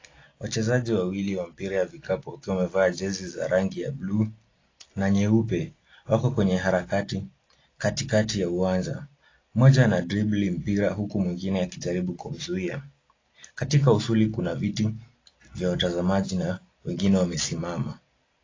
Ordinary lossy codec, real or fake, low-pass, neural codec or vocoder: AAC, 32 kbps; real; 7.2 kHz; none